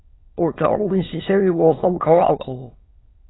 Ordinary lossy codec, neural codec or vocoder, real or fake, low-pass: AAC, 16 kbps; autoencoder, 22.05 kHz, a latent of 192 numbers a frame, VITS, trained on many speakers; fake; 7.2 kHz